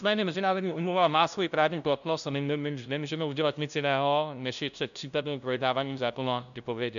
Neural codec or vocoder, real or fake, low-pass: codec, 16 kHz, 0.5 kbps, FunCodec, trained on LibriTTS, 25 frames a second; fake; 7.2 kHz